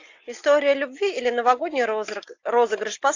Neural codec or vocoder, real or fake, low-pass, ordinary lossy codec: none; real; 7.2 kHz; AAC, 48 kbps